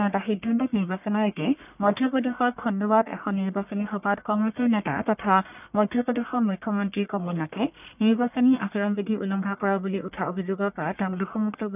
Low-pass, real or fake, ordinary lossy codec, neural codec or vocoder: 3.6 kHz; fake; none; codec, 44.1 kHz, 1.7 kbps, Pupu-Codec